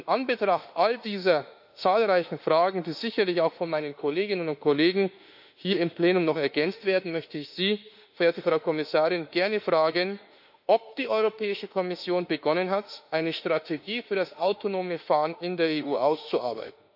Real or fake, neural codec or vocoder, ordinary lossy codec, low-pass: fake; autoencoder, 48 kHz, 32 numbers a frame, DAC-VAE, trained on Japanese speech; none; 5.4 kHz